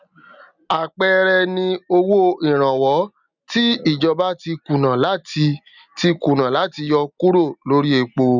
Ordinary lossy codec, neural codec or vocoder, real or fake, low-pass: none; none; real; 7.2 kHz